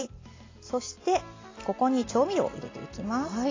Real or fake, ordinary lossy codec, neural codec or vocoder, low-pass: real; AAC, 32 kbps; none; 7.2 kHz